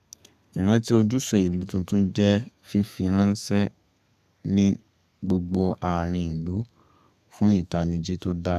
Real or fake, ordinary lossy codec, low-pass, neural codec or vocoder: fake; none; 14.4 kHz; codec, 32 kHz, 1.9 kbps, SNAC